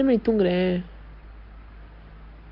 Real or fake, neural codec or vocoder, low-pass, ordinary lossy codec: real; none; 5.4 kHz; Opus, 24 kbps